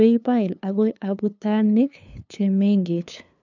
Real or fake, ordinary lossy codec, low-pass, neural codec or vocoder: fake; none; 7.2 kHz; codec, 16 kHz, 2 kbps, FunCodec, trained on LibriTTS, 25 frames a second